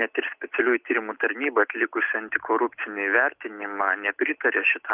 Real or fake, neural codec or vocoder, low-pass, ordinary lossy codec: real; none; 3.6 kHz; Opus, 24 kbps